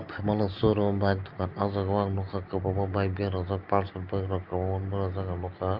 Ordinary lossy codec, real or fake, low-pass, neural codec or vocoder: Opus, 32 kbps; real; 5.4 kHz; none